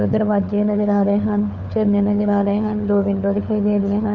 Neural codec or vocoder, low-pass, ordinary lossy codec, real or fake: codec, 16 kHz, 4 kbps, FunCodec, trained on LibriTTS, 50 frames a second; 7.2 kHz; none; fake